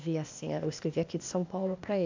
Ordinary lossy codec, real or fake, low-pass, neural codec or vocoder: AAC, 48 kbps; fake; 7.2 kHz; codec, 16 kHz, 0.8 kbps, ZipCodec